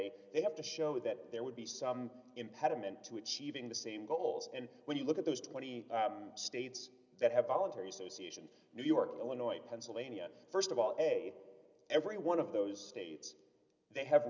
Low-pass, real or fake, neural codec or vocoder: 7.2 kHz; real; none